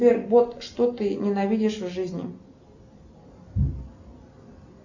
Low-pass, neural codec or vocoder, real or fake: 7.2 kHz; none; real